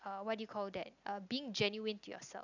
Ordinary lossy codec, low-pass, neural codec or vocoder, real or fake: none; 7.2 kHz; none; real